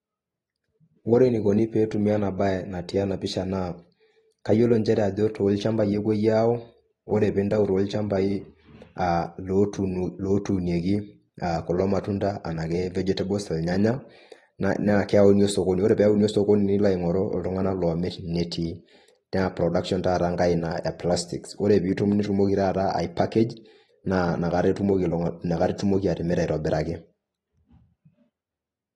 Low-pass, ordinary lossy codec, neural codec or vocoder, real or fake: 19.8 kHz; AAC, 32 kbps; none; real